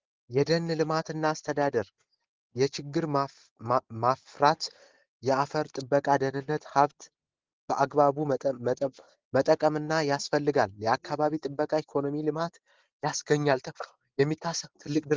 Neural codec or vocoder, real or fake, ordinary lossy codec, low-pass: none; real; Opus, 16 kbps; 7.2 kHz